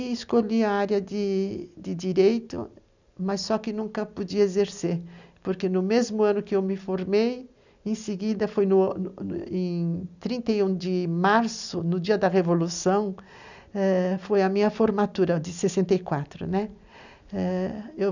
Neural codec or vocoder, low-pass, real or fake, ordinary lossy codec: none; 7.2 kHz; real; none